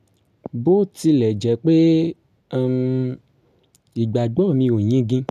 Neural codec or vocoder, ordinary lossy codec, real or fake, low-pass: none; none; real; 14.4 kHz